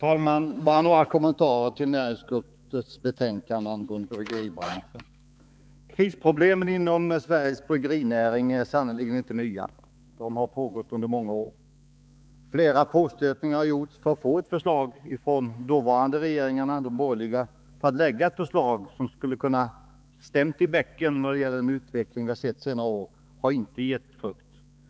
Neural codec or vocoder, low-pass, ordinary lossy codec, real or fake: codec, 16 kHz, 4 kbps, X-Codec, HuBERT features, trained on balanced general audio; none; none; fake